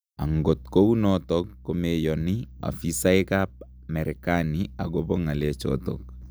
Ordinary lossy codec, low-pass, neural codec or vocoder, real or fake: none; none; none; real